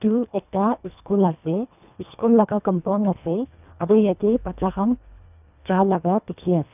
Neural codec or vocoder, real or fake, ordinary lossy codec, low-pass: codec, 24 kHz, 1.5 kbps, HILCodec; fake; none; 3.6 kHz